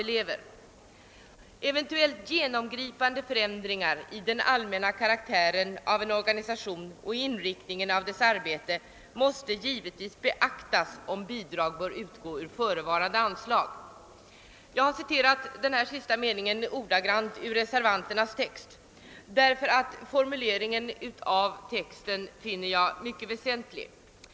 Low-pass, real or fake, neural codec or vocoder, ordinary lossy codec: none; real; none; none